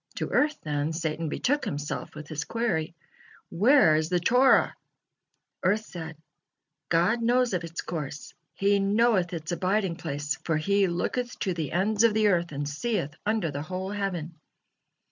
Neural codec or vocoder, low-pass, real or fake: none; 7.2 kHz; real